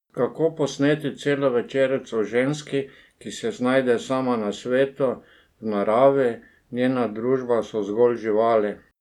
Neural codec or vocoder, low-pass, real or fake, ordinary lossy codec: none; 19.8 kHz; real; none